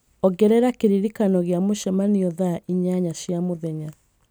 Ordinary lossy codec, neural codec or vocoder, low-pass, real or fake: none; none; none; real